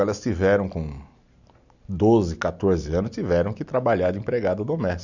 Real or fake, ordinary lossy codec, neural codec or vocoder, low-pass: real; none; none; 7.2 kHz